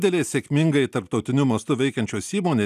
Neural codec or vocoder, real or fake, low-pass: none; real; 14.4 kHz